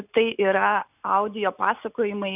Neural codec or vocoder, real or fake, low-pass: none; real; 3.6 kHz